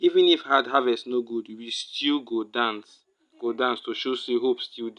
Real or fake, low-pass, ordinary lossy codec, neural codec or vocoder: real; 10.8 kHz; none; none